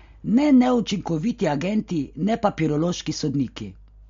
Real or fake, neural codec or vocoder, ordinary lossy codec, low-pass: real; none; MP3, 48 kbps; 7.2 kHz